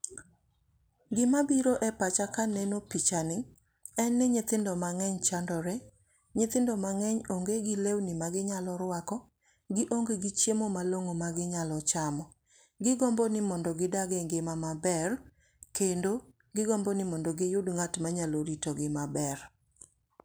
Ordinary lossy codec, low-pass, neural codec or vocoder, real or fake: none; none; none; real